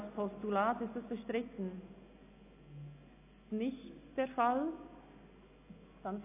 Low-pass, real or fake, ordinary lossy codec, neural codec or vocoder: 3.6 kHz; real; AAC, 24 kbps; none